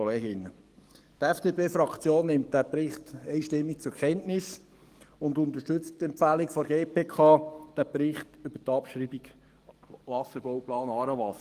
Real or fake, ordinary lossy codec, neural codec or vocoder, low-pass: fake; Opus, 24 kbps; codec, 44.1 kHz, 7.8 kbps, DAC; 14.4 kHz